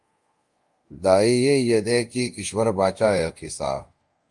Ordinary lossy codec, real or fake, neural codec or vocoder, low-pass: Opus, 32 kbps; fake; codec, 24 kHz, 0.5 kbps, DualCodec; 10.8 kHz